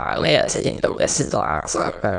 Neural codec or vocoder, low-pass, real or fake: autoencoder, 22.05 kHz, a latent of 192 numbers a frame, VITS, trained on many speakers; 9.9 kHz; fake